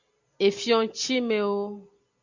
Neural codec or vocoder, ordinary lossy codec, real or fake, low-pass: none; Opus, 64 kbps; real; 7.2 kHz